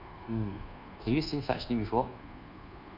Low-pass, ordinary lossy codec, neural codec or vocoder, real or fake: 5.4 kHz; MP3, 48 kbps; codec, 24 kHz, 1.2 kbps, DualCodec; fake